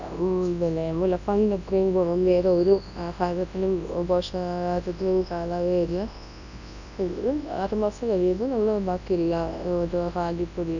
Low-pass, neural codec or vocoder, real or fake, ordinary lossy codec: 7.2 kHz; codec, 24 kHz, 0.9 kbps, WavTokenizer, large speech release; fake; none